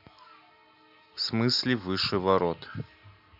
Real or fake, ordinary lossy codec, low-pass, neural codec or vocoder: real; none; 5.4 kHz; none